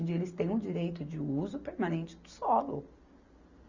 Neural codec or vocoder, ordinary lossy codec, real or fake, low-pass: none; Opus, 64 kbps; real; 7.2 kHz